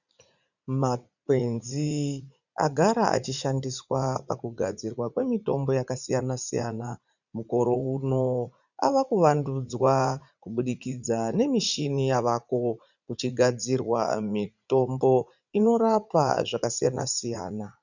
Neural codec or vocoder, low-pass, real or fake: vocoder, 44.1 kHz, 80 mel bands, Vocos; 7.2 kHz; fake